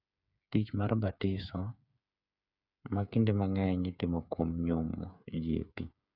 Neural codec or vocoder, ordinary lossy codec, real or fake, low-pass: codec, 16 kHz, 8 kbps, FreqCodec, smaller model; none; fake; 5.4 kHz